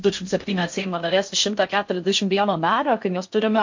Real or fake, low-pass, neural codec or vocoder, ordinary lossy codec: fake; 7.2 kHz; codec, 16 kHz in and 24 kHz out, 0.6 kbps, FocalCodec, streaming, 4096 codes; MP3, 48 kbps